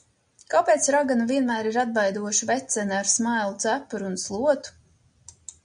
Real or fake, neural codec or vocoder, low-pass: real; none; 9.9 kHz